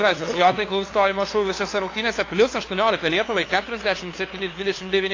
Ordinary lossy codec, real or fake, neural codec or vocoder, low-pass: AAC, 32 kbps; fake; codec, 16 kHz, 2 kbps, FunCodec, trained on LibriTTS, 25 frames a second; 7.2 kHz